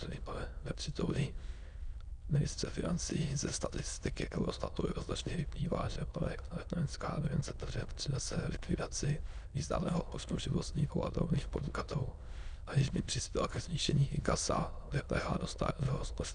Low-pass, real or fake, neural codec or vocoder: 9.9 kHz; fake; autoencoder, 22.05 kHz, a latent of 192 numbers a frame, VITS, trained on many speakers